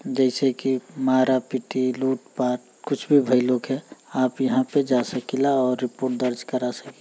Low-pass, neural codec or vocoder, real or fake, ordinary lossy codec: none; none; real; none